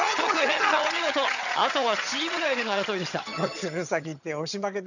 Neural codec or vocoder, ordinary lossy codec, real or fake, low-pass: vocoder, 22.05 kHz, 80 mel bands, HiFi-GAN; none; fake; 7.2 kHz